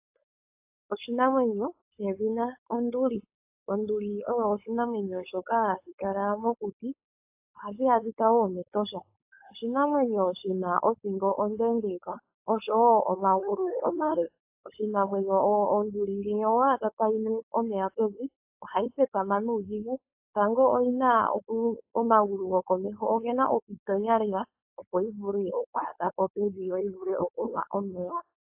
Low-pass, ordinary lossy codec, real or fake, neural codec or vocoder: 3.6 kHz; AAC, 32 kbps; fake; codec, 16 kHz, 4.8 kbps, FACodec